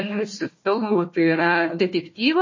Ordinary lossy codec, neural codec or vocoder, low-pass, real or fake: MP3, 32 kbps; codec, 16 kHz, 1 kbps, FunCodec, trained on Chinese and English, 50 frames a second; 7.2 kHz; fake